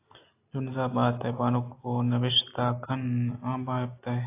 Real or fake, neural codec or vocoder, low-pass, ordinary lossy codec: real; none; 3.6 kHz; AAC, 24 kbps